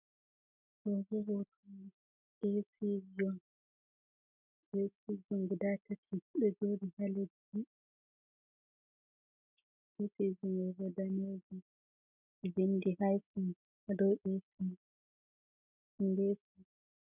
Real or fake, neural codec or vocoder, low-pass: real; none; 3.6 kHz